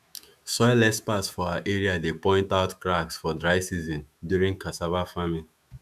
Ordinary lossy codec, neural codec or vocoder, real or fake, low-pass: none; autoencoder, 48 kHz, 128 numbers a frame, DAC-VAE, trained on Japanese speech; fake; 14.4 kHz